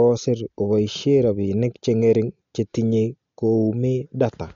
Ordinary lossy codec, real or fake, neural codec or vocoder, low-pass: MP3, 48 kbps; real; none; 7.2 kHz